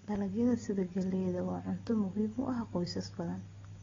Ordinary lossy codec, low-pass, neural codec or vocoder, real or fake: AAC, 24 kbps; 7.2 kHz; none; real